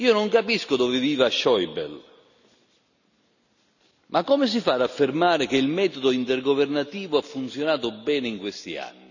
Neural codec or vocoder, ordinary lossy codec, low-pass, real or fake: none; none; 7.2 kHz; real